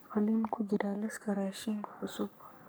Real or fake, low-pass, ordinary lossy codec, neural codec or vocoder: fake; none; none; codec, 44.1 kHz, 3.4 kbps, Pupu-Codec